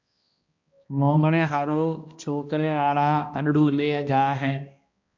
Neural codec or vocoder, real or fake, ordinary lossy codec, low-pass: codec, 16 kHz, 1 kbps, X-Codec, HuBERT features, trained on balanced general audio; fake; MP3, 48 kbps; 7.2 kHz